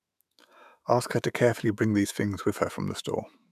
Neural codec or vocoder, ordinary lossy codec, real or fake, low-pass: autoencoder, 48 kHz, 128 numbers a frame, DAC-VAE, trained on Japanese speech; none; fake; 14.4 kHz